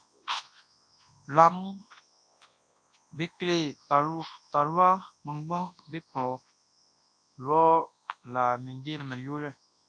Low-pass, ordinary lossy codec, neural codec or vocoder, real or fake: 9.9 kHz; AAC, 64 kbps; codec, 24 kHz, 0.9 kbps, WavTokenizer, large speech release; fake